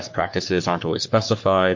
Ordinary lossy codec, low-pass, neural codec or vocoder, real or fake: MP3, 48 kbps; 7.2 kHz; codec, 44.1 kHz, 3.4 kbps, Pupu-Codec; fake